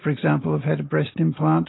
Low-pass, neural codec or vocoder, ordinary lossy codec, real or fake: 7.2 kHz; none; AAC, 16 kbps; real